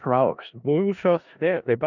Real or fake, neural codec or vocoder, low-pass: fake; codec, 16 kHz in and 24 kHz out, 0.4 kbps, LongCat-Audio-Codec, four codebook decoder; 7.2 kHz